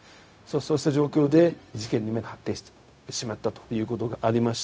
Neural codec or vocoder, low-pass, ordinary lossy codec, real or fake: codec, 16 kHz, 0.4 kbps, LongCat-Audio-Codec; none; none; fake